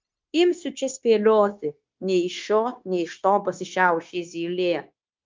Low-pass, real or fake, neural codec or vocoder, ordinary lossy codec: 7.2 kHz; fake; codec, 16 kHz, 0.9 kbps, LongCat-Audio-Codec; Opus, 24 kbps